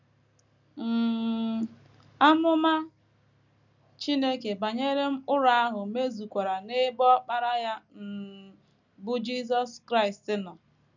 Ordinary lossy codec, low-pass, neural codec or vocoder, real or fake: none; 7.2 kHz; none; real